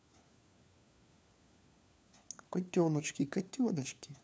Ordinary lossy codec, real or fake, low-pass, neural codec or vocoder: none; fake; none; codec, 16 kHz, 4 kbps, FunCodec, trained on LibriTTS, 50 frames a second